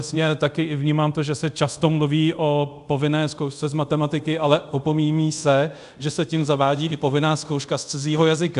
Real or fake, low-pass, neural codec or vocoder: fake; 10.8 kHz; codec, 24 kHz, 0.5 kbps, DualCodec